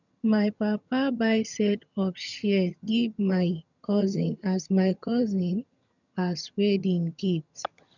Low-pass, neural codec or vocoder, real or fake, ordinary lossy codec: 7.2 kHz; vocoder, 22.05 kHz, 80 mel bands, HiFi-GAN; fake; none